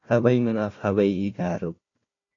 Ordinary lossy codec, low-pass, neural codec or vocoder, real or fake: AAC, 32 kbps; 7.2 kHz; codec, 16 kHz, 1 kbps, FunCodec, trained on Chinese and English, 50 frames a second; fake